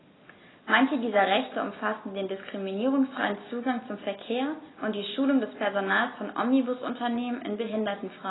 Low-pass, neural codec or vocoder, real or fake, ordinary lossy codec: 7.2 kHz; none; real; AAC, 16 kbps